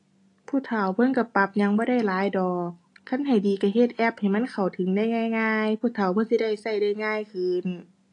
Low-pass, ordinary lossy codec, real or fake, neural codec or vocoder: 10.8 kHz; AAC, 48 kbps; real; none